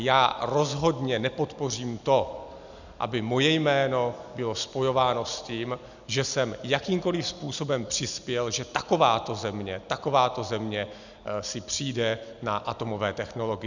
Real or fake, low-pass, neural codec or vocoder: real; 7.2 kHz; none